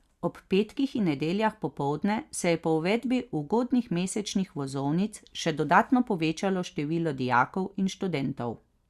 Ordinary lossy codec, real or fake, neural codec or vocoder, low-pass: Opus, 64 kbps; real; none; 14.4 kHz